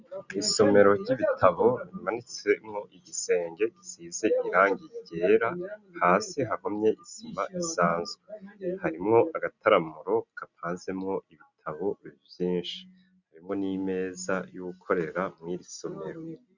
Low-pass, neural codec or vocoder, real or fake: 7.2 kHz; none; real